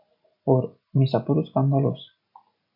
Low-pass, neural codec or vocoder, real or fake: 5.4 kHz; none; real